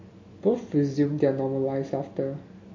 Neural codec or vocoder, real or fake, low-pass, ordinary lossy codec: none; real; 7.2 kHz; MP3, 32 kbps